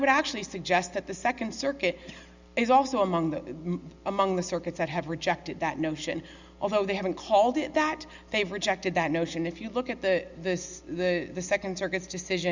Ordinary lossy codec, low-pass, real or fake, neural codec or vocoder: Opus, 64 kbps; 7.2 kHz; real; none